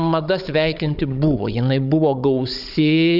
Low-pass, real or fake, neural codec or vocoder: 5.4 kHz; fake; codec, 16 kHz, 16 kbps, FunCodec, trained on Chinese and English, 50 frames a second